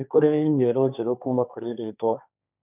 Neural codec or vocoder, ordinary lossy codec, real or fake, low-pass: codec, 16 kHz, 1.1 kbps, Voila-Tokenizer; none; fake; 3.6 kHz